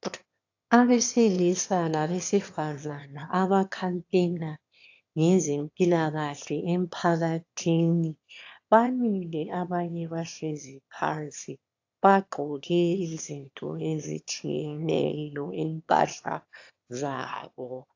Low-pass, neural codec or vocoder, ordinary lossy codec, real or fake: 7.2 kHz; autoencoder, 22.05 kHz, a latent of 192 numbers a frame, VITS, trained on one speaker; AAC, 48 kbps; fake